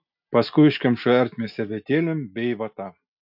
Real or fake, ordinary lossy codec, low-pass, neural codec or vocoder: real; AAC, 48 kbps; 5.4 kHz; none